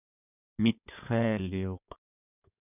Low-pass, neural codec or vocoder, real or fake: 3.6 kHz; codec, 16 kHz in and 24 kHz out, 2.2 kbps, FireRedTTS-2 codec; fake